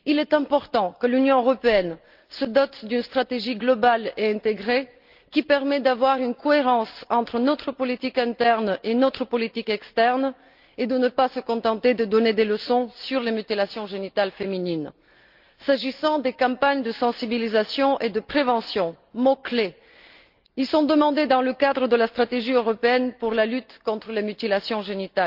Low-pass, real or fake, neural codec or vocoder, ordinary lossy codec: 5.4 kHz; real; none; Opus, 24 kbps